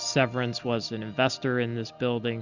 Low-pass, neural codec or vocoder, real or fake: 7.2 kHz; none; real